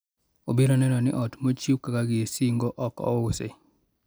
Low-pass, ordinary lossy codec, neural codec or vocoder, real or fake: none; none; none; real